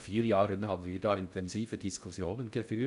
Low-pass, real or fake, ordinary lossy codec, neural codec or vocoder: 10.8 kHz; fake; none; codec, 16 kHz in and 24 kHz out, 0.6 kbps, FocalCodec, streaming, 2048 codes